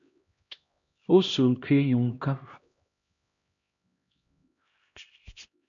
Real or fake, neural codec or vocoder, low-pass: fake; codec, 16 kHz, 1 kbps, X-Codec, HuBERT features, trained on LibriSpeech; 7.2 kHz